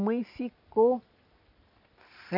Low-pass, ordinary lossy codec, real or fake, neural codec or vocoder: 5.4 kHz; none; real; none